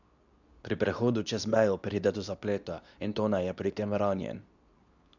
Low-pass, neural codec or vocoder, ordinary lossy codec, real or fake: 7.2 kHz; codec, 24 kHz, 0.9 kbps, WavTokenizer, medium speech release version 2; none; fake